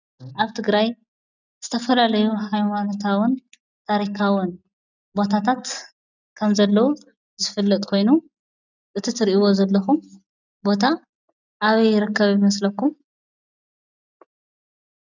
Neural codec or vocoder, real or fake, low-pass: none; real; 7.2 kHz